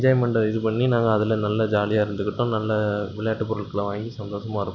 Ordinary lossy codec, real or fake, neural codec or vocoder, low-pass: none; real; none; 7.2 kHz